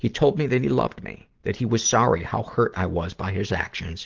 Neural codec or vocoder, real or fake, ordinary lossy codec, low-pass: none; real; Opus, 16 kbps; 7.2 kHz